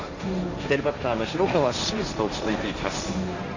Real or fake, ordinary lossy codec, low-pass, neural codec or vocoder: fake; Opus, 64 kbps; 7.2 kHz; codec, 16 kHz, 1.1 kbps, Voila-Tokenizer